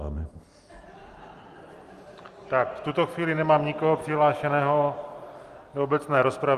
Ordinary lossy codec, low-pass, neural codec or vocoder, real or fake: Opus, 32 kbps; 14.4 kHz; none; real